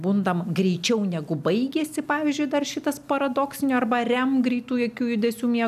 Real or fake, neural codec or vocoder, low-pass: real; none; 14.4 kHz